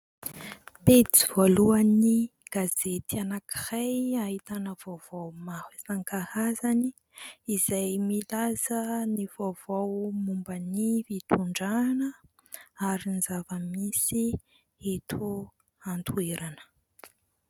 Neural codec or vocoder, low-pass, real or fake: none; 19.8 kHz; real